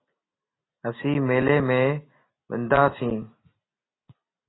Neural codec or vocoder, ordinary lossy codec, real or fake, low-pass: none; AAC, 16 kbps; real; 7.2 kHz